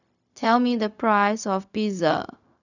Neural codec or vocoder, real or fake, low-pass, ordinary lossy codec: codec, 16 kHz, 0.4 kbps, LongCat-Audio-Codec; fake; 7.2 kHz; none